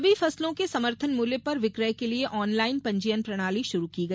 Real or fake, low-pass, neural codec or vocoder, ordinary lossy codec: real; none; none; none